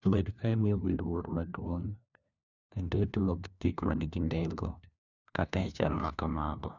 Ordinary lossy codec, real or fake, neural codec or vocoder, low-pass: none; fake; codec, 16 kHz, 1 kbps, FunCodec, trained on LibriTTS, 50 frames a second; 7.2 kHz